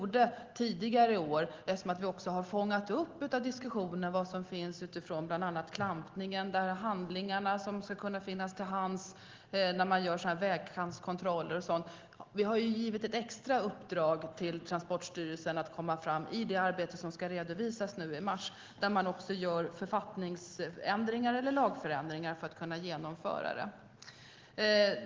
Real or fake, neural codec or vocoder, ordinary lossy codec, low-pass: real; none; Opus, 16 kbps; 7.2 kHz